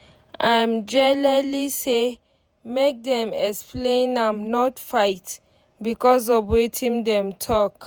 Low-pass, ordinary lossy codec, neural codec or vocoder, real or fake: none; none; vocoder, 48 kHz, 128 mel bands, Vocos; fake